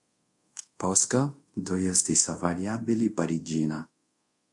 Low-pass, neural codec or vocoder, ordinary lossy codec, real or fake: 10.8 kHz; codec, 24 kHz, 0.5 kbps, DualCodec; MP3, 48 kbps; fake